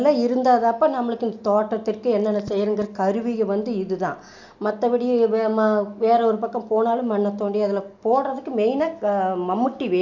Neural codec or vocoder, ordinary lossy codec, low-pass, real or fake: none; none; 7.2 kHz; real